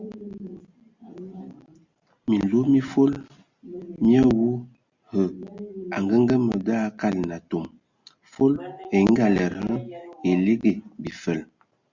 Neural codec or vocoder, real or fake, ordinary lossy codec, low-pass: none; real; Opus, 64 kbps; 7.2 kHz